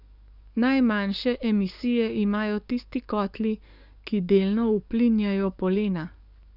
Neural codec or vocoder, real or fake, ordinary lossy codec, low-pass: codec, 44.1 kHz, 7.8 kbps, DAC; fake; none; 5.4 kHz